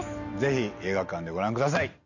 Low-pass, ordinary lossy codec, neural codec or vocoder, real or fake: 7.2 kHz; none; none; real